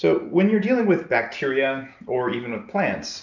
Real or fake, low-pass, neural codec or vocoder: real; 7.2 kHz; none